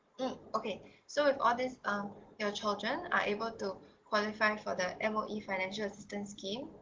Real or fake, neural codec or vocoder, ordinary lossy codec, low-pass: real; none; Opus, 16 kbps; 7.2 kHz